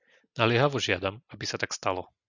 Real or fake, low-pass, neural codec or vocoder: real; 7.2 kHz; none